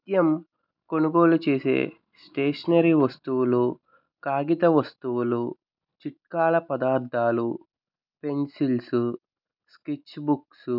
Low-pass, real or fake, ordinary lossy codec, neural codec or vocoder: 5.4 kHz; real; none; none